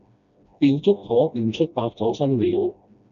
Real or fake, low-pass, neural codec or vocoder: fake; 7.2 kHz; codec, 16 kHz, 1 kbps, FreqCodec, smaller model